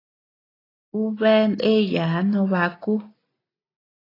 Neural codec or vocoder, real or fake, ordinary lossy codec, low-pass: none; real; AAC, 24 kbps; 5.4 kHz